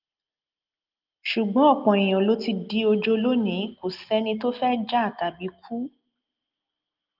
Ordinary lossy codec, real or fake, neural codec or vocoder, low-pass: Opus, 32 kbps; real; none; 5.4 kHz